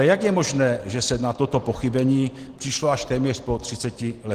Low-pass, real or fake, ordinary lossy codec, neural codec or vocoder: 14.4 kHz; real; Opus, 16 kbps; none